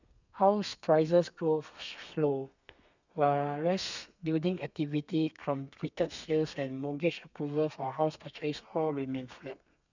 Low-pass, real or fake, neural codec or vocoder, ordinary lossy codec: 7.2 kHz; fake; codec, 32 kHz, 1.9 kbps, SNAC; none